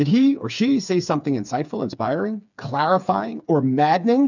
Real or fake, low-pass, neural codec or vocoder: fake; 7.2 kHz; codec, 16 kHz, 4 kbps, FreqCodec, smaller model